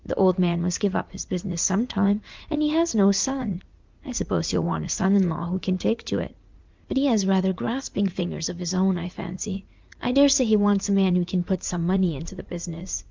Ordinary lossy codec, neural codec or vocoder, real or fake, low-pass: Opus, 32 kbps; vocoder, 44.1 kHz, 80 mel bands, Vocos; fake; 7.2 kHz